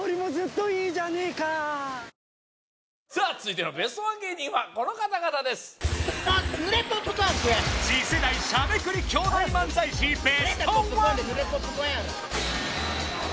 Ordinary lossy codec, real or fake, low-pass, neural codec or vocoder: none; real; none; none